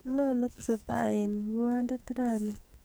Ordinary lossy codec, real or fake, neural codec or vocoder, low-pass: none; fake; codec, 44.1 kHz, 2.6 kbps, SNAC; none